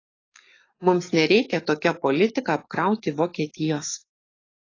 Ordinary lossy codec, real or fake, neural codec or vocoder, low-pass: AAC, 32 kbps; fake; codec, 44.1 kHz, 7.8 kbps, DAC; 7.2 kHz